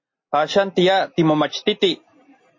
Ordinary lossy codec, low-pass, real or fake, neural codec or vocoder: MP3, 32 kbps; 7.2 kHz; real; none